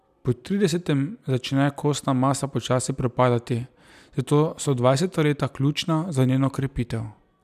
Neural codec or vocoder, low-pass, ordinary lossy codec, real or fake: none; 14.4 kHz; none; real